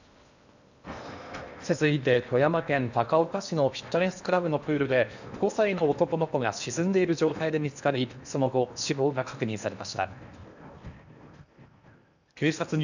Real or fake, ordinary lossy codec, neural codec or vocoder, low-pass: fake; none; codec, 16 kHz in and 24 kHz out, 0.8 kbps, FocalCodec, streaming, 65536 codes; 7.2 kHz